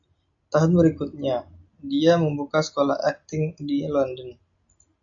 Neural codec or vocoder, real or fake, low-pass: none; real; 7.2 kHz